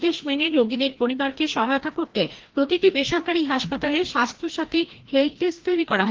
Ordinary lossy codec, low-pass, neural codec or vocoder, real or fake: Opus, 16 kbps; 7.2 kHz; codec, 16 kHz, 1 kbps, FreqCodec, larger model; fake